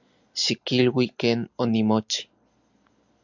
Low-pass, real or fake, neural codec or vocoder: 7.2 kHz; real; none